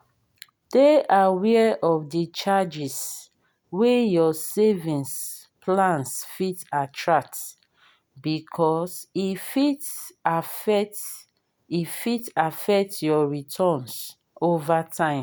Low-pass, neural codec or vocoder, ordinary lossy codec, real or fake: none; none; none; real